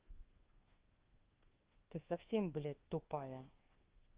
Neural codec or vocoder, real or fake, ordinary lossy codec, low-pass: codec, 24 kHz, 1.2 kbps, DualCodec; fake; Opus, 16 kbps; 3.6 kHz